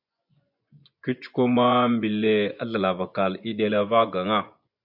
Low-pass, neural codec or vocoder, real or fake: 5.4 kHz; vocoder, 24 kHz, 100 mel bands, Vocos; fake